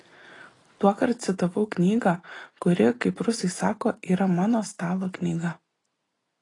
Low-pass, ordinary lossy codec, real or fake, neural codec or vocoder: 10.8 kHz; AAC, 32 kbps; real; none